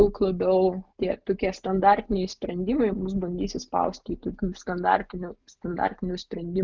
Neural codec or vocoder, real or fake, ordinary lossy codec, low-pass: none; real; Opus, 24 kbps; 7.2 kHz